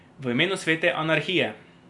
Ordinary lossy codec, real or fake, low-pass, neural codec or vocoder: Opus, 64 kbps; real; 10.8 kHz; none